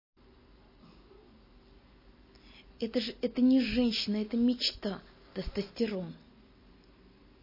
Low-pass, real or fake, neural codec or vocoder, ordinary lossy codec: 5.4 kHz; real; none; MP3, 24 kbps